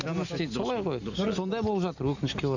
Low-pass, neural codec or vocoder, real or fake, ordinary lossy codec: 7.2 kHz; none; real; none